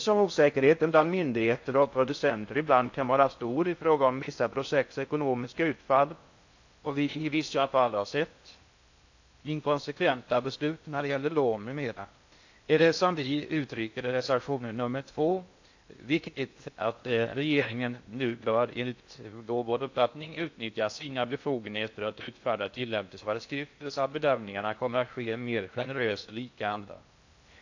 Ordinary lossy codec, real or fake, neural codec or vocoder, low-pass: AAC, 48 kbps; fake; codec, 16 kHz in and 24 kHz out, 0.6 kbps, FocalCodec, streaming, 4096 codes; 7.2 kHz